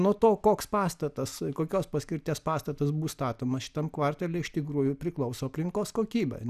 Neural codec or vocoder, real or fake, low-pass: none; real; 14.4 kHz